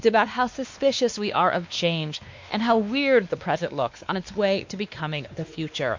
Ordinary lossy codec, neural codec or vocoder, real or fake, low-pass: MP3, 48 kbps; codec, 16 kHz, 2 kbps, X-Codec, WavLM features, trained on Multilingual LibriSpeech; fake; 7.2 kHz